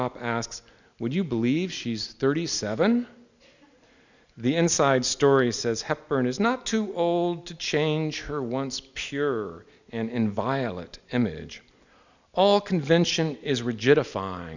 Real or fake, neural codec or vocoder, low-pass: real; none; 7.2 kHz